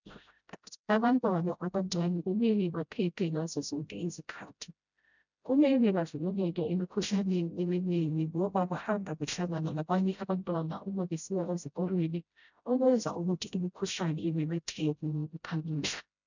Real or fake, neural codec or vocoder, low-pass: fake; codec, 16 kHz, 0.5 kbps, FreqCodec, smaller model; 7.2 kHz